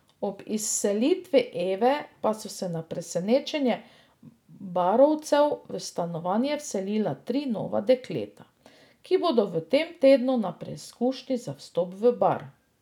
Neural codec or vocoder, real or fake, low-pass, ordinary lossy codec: none; real; 19.8 kHz; none